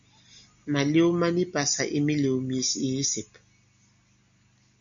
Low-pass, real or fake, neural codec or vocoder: 7.2 kHz; real; none